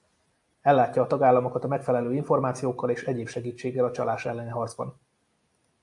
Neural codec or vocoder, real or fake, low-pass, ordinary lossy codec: none; real; 10.8 kHz; AAC, 64 kbps